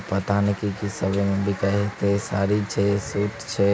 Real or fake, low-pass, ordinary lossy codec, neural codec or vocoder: real; none; none; none